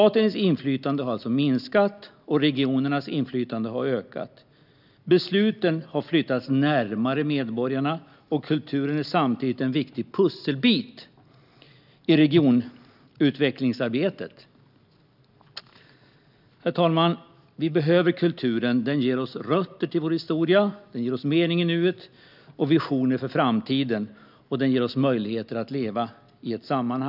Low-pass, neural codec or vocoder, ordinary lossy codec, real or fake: 5.4 kHz; none; none; real